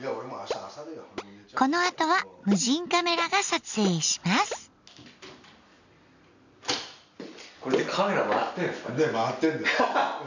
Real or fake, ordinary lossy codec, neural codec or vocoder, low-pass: real; none; none; 7.2 kHz